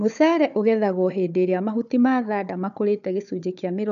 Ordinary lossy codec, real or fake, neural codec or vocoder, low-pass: none; fake; codec, 16 kHz, 4 kbps, FunCodec, trained on Chinese and English, 50 frames a second; 7.2 kHz